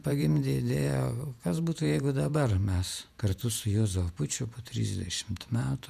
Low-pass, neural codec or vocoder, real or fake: 14.4 kHz; vocoder, 48 kHz, 128 mel bands, Vocos; fake